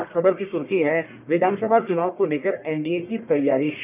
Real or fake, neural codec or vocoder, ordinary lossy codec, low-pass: fake; codec, 44.1 kHz, 1.7 kbps, Pupu-Codec; none; 3.6 kHz